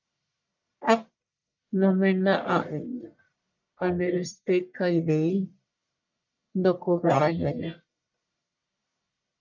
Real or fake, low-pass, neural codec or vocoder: fake; 7.2 kHz; codec, 44.1 kHz, 1.7 kbps, Pupu-Codec